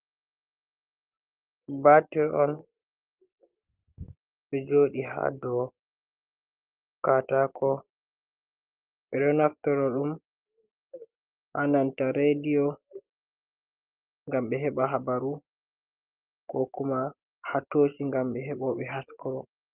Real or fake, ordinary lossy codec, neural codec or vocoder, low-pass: real; Opus, 32 kbps; none; 3.6 kHz